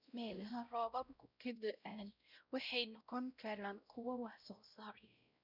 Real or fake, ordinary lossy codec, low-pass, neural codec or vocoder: fake; none; 5.4 kHz; codec, 16 kHz, 0.5 kbps, X-Codec, WavLM features, trained on Multilingual LibriSpeech